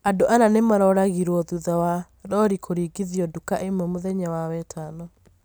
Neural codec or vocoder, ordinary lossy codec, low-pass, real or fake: none; none; none; real